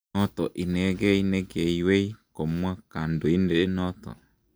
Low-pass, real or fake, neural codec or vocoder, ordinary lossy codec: none; real; none; none